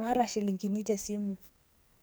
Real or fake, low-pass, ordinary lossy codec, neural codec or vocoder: fake; none; none; codec, 44.1 kHz, 2.6 kbps, SNAC